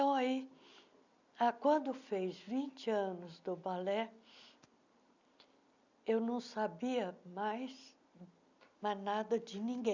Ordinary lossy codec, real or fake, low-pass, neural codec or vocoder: none; real; 7.2 kHz; none